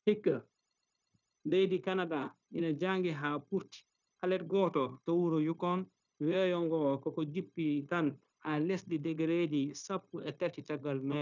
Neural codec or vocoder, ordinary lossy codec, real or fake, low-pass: codec, 16 kHz, 0.9 kbps, LongCat-Audio-Codec; none; fake; 7.2 kHz